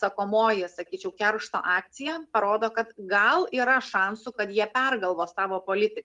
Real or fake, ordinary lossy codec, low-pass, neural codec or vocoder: real; Opus, 32 kbps; 10.8 kHz; none